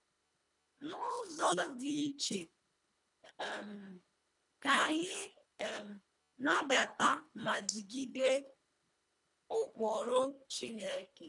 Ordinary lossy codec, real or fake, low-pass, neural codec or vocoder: none; fake; 10.8 kHz; codec, 24 kHz, 1.5 kbps, HILCodec